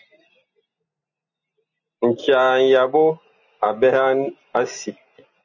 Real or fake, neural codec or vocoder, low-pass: real; none; 7.2 kHz